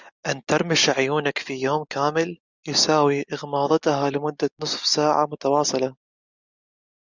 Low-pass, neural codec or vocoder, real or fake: 7.2 kHz; none; real